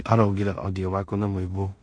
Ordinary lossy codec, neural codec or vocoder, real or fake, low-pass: MP3, 48 kbps; codec, 16 kHz in and 24 kHz out, 0.4 kbps, LongCat-Audio-Codec, two codebook decoder; fake; 9.9 kHz